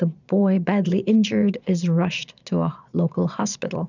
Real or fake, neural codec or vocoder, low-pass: fake; vocoder, 22.05 kHz, 80 mel bands, Vocos; 7.2 kHz